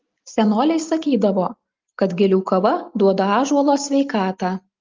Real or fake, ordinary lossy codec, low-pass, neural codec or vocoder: real; Opus, 32 kbps; 7.2 kHz; none